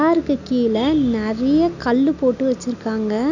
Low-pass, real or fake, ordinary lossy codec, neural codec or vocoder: 7.2 kHz; real; none; none